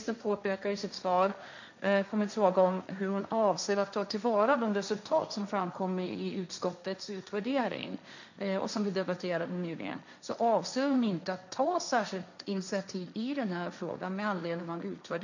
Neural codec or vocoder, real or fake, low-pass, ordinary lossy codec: codec, 16 kHz, 1.1 kbps, Voila-Tokenizer; fake; 7.2 kHz; none